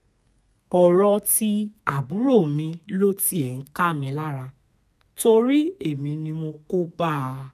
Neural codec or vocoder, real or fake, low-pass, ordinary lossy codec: codec, 32 kHz, 1.9 kbps, SNAC; fake; 14.4 kHz; none